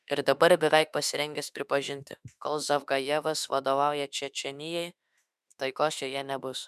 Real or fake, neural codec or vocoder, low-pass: fake; autoencoder, 48 kHz, 32 numbers a frame, DAC-VAE, trained on Japanese speech; 14.4 kHz